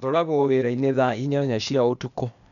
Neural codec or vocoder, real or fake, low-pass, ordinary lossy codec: codec, 16 kHz, 0.8 kbps, ZipCodec; fake; 7.2 kHz; none